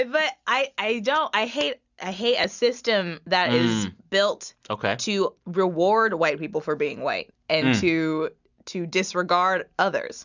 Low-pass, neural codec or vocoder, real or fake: 7.2 kHz; none; real